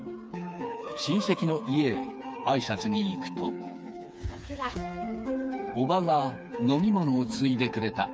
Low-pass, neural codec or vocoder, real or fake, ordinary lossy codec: none; codec, 16 kHz, 4 kbps, FreqCodec, smaller model; fake; none